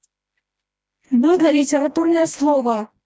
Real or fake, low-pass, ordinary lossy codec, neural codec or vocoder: fake; none; none; codec, 16 kHz, 1 kbps, FreqCodec, smaller model